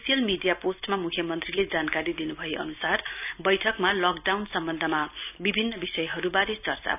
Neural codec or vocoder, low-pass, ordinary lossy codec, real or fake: none; 3.6 kHz; none; real